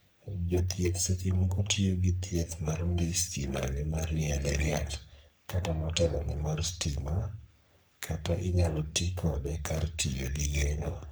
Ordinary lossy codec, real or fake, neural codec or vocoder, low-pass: none; fake; codec, 44.1 kHz, 3.4 kbps, Pupu-Codec; none